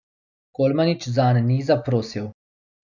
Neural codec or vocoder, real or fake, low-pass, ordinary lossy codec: none; real; 7.2 kHz; MP3, 64 kbps